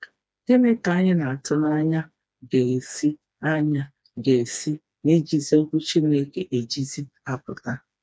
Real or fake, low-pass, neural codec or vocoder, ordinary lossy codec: fake; none; codec, 16 kHz, 2 kbps, FreqCodec, smaller model; none